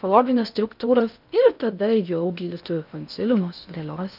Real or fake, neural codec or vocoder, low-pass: fake; codec, 16 kHz in and 24 kHz out, 0.6 kbps, FocalCodec, streaming, 4096 codes; 5.4 kHz